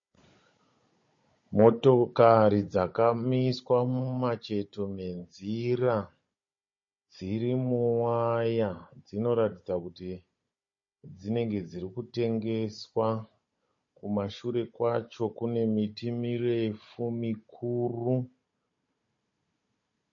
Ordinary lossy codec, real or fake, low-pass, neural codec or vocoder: MP3, 32 kbps; fake; 7.2 kHz; codec, 16 kHz, 16 kbps, FunCodec, trained on Chinese and English, 50 frames a second